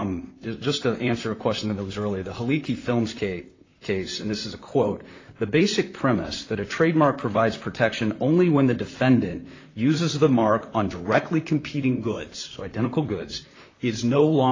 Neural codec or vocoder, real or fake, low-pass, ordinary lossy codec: vocoder, 44.1 kHz, 128 mel bands, Pupu-Vocoder; fake; 7.2 kHz; AAC, 32 kbps